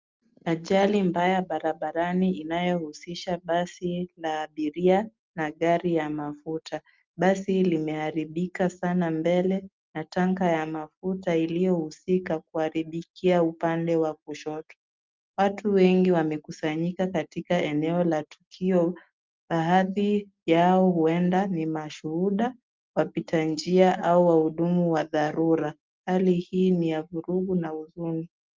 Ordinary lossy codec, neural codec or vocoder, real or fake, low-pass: Opus, 24 kbps; none; real; 7.2 kHz